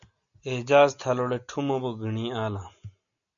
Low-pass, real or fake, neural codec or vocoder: 7.2 kHz; real; none